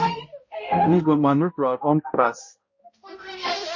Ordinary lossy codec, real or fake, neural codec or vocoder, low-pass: MP3, 32 kbps; fake; codec, 16 kHz, 0.5 kbps, X-Codec, HuBERT features, trained on balanced general audio; 7.2 kHz